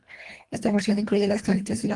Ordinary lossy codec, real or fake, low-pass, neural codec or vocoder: Opus, 24 kbps; fake; 10.8 kHz; codec, 24 kHz, 1.5 kbps, HILCodec